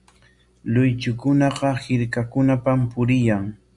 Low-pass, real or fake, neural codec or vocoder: 10.8 kHz; real; none